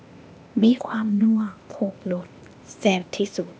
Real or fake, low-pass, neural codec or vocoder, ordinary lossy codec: fake; none; codec, 16 kHz, 0.8 kbps, ZipCodec; none